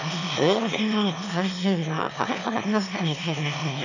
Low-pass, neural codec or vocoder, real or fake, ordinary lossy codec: 7.2 kHz; autoencoder, 22.05 kHz, a latent of 192 numbers a frame, VITS, trained on one speaker; fake; none